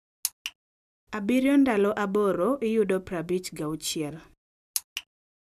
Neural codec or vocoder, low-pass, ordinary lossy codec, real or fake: none; 14.4 kHz; none; real